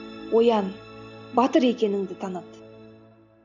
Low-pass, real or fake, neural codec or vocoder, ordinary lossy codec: 7.2 kHz; real; none; none